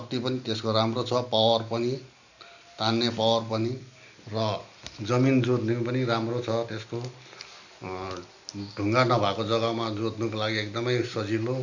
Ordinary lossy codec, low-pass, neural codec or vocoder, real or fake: none; 7.2 kHz; none; real